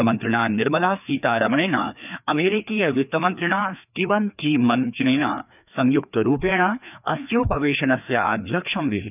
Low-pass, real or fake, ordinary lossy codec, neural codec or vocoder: 3.6 kHz; fake; none; codec, 16 kHz, 2 kbps, FreqCodec, larger model